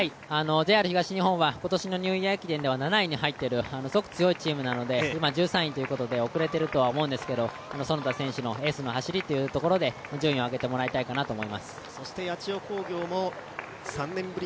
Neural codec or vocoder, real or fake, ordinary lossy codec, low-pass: none; real; none; none